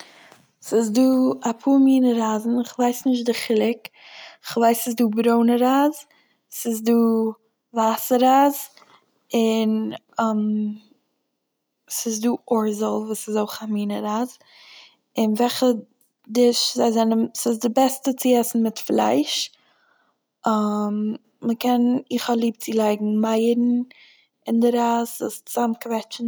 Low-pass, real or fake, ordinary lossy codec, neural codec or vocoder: none; real; none; none